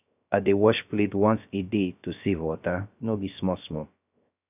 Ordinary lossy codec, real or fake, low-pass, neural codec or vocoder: none; fake; 3.6 kHz; codec, 16 kHz, 0.3 kbps, FocalCodec